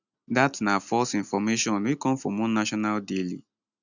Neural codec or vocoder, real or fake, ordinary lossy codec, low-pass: none; real; none; 7.2 kHz